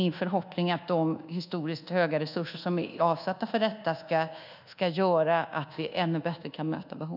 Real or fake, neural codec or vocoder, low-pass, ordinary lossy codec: fake; codec, 24 kHz, 1.2 kbps, DualCodec; 5.4 kHz; none